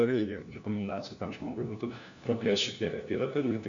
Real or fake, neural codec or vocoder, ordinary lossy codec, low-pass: fake; codec, 16 kHz, 1 kbps, FunCodec, trained on LibriTTS, 50 frames a second; MP3, 64 kbps; 7.2 kHz